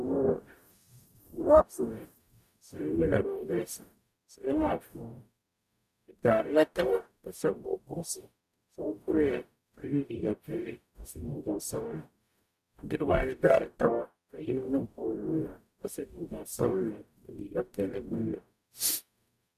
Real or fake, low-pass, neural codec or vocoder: fake; 14.4 kHz; codec, 44.1 kHz, 0.9 kbps, DAC